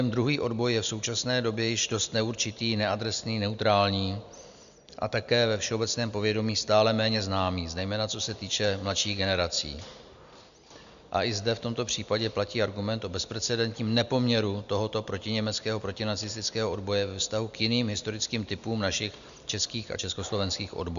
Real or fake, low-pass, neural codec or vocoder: real; 7.2 kHz; none